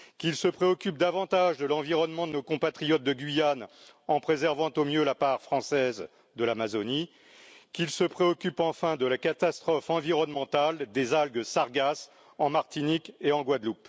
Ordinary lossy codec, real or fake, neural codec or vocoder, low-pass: none; real; none; none